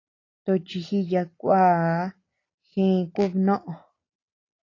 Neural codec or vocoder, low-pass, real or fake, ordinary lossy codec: none; 7.2 kHz; real; AAC, 32 kbps